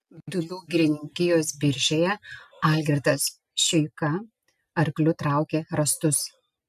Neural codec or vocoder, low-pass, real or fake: none; 14.4 kHz; real